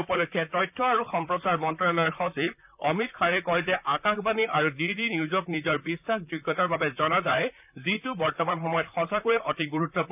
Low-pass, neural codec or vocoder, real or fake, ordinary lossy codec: 3.6 kHz; vocoder, 44.1 kHz, 128 mel bands, Pupu-Vocoder; fake; none